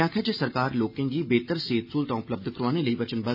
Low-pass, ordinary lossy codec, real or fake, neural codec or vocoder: 5.4 kHz; none; real; none